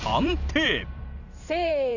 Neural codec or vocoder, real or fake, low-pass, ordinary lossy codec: vocoder, 44.1 kHz, 128 mel bands every 512 samples, BigVGAN v2; fake; 7.2 kHz; none